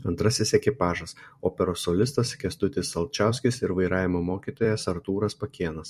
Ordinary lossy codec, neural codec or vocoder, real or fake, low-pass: MP3, 64 kbps; vocoder, 44.1 kHz, 128 mel bands every 256 samples, BigVGAN v2; fake; 14.4 kHz